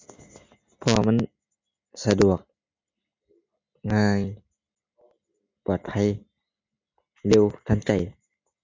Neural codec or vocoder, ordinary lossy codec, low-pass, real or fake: none; MP3, 48 kbps; 7.2 kHz; real